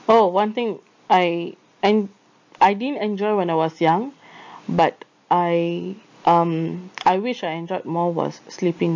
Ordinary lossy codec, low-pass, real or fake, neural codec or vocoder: MP3, 48 kbps; 7.2 kHz; real; none